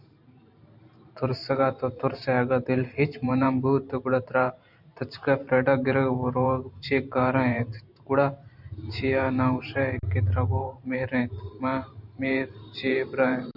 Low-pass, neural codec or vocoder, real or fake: 5.4 kHz; vocoder, 44.1 kHz, 128 mel bands every 512 samples, BigVGAN v2; fake